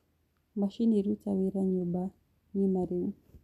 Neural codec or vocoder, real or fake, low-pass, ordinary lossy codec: none; real; 14.4 kHz; none